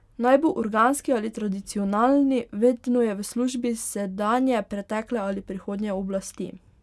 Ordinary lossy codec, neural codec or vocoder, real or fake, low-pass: none; none; real; none